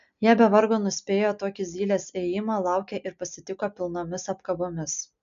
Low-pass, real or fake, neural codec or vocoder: 7.2 kHz; real; none